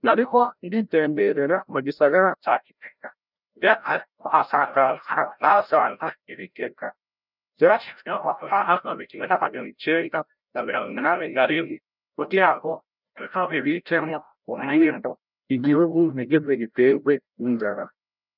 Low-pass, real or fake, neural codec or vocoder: 5.4 kHz; fake; codec, 16 kHz, 0.5 kbps, FreqCodec, larger model